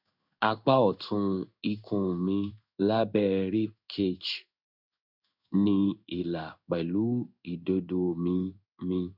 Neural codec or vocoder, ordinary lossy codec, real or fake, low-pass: codec, 16 kHz in and 24 kHz out, 1 kbps, XY-Tokenizer; none; fake; 5.4 kHz